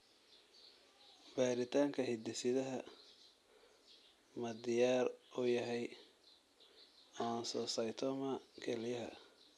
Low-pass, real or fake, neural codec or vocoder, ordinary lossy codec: none; real; none; none